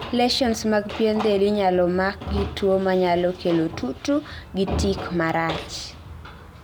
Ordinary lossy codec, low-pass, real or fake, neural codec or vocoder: none; none; real; none